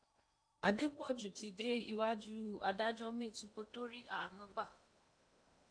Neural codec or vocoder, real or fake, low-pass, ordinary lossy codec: codec, 16 kHz in and 24 kHz out, 0.8 kbps, FocalCodec, streaming, 65536 codes; fake; 9.9 kHz; AAC, 64 kbps